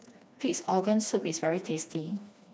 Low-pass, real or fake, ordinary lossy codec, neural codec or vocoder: none; fake; none; codec, 16 kHz, 2 kbps, FreqCodec, smaller model